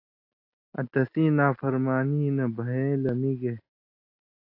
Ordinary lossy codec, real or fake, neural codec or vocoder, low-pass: AAC, 32 kbps; real; none; 5.4 kHz